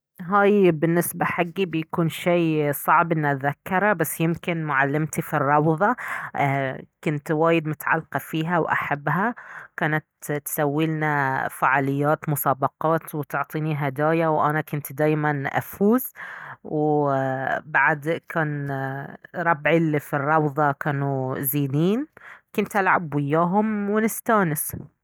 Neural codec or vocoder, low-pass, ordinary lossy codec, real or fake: none; none; none; real